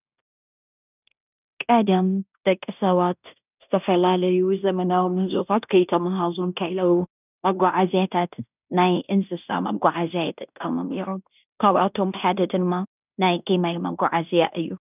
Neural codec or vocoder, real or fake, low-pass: codec, 16 kHz in and 24 kHz out, 0.9 kbps, LongCat-Audio-Codec, fine tuned four codebook decoder; fake; 3.6 kHz